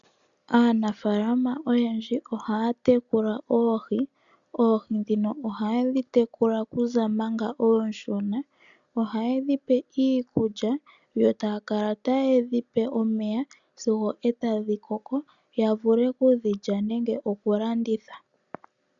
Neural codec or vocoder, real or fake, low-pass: none; real; 7.2 kHz